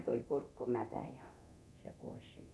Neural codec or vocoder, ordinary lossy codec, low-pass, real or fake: codec, 24 kHz, 0.9 kbps, DualCodec; none; none; fake